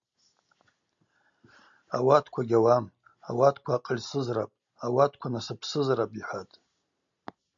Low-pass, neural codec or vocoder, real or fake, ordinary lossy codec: 7.2 kHz; none; real; MP3, 64 kbps